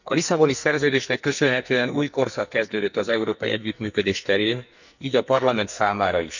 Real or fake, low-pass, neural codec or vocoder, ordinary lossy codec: fake; 7.2 kHz; codec, 44.1 kHz, 2.6 kbps, SNAC; none